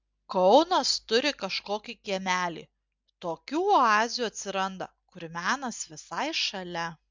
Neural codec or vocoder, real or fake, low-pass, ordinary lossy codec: none; real; 7.2 kHz; MP3, 64 kbps